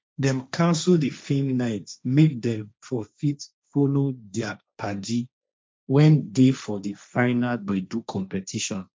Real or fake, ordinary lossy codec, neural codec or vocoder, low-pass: fake; none; codec, 16 kHz, 1.1 kbps, Voila-Tokenizer; none